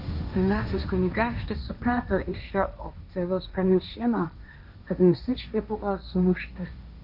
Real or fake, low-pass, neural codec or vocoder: fake; 5.4 kHz; codec, 16 kHz, 1.1 kbps, Voila-Tokenizer